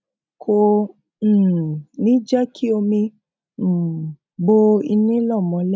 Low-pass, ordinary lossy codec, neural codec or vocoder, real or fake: none; none; none; real